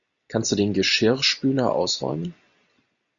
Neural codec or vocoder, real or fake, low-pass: none; real; 7.2 kHz